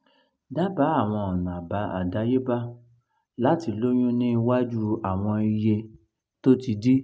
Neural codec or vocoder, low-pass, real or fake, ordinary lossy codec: none; none; real; none